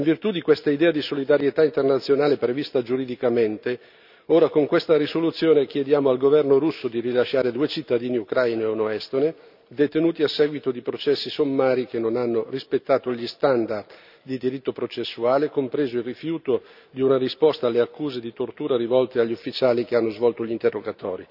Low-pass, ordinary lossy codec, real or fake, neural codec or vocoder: 5.4 kHz; none; real; none